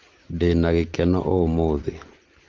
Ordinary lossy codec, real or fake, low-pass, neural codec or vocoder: Opus, 16 kbps; real; 7.2 kHz; none